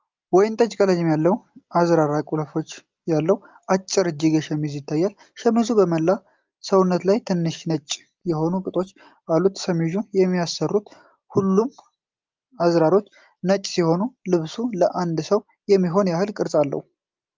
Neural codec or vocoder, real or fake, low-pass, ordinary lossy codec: none; real; 7.2 kHz; Opus, 24 kbps